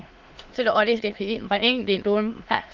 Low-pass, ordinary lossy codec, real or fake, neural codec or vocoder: 7.2 kHz; Opus, 32 kbps; fake; autoencoder, 22.05 kHz, a latent of 192 numbers a frame, VITS, trained on many speakers